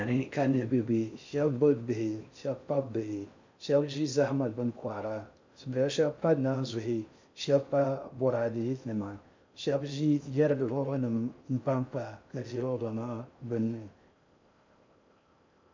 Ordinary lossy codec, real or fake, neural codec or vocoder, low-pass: MP3, 64 kbps; fake; codec, 16 kHz in and 24 kHz out, 0.6 kbps, FocalCodec, streaming, 4096 codes; 7.2 kHz